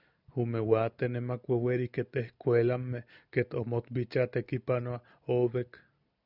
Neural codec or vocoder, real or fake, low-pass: none; real; 5.4 kHz